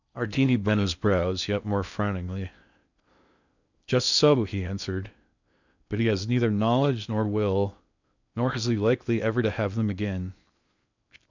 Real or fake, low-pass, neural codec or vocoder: fake; 7.2 kHz; codec, 16 kHz in and 24 kHz out, 0.6 kbps, FocalCodec, streaming, 4096 codes